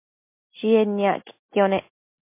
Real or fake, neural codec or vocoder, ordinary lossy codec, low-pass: real; none; MP3, 24 kbps; 3.6 kHz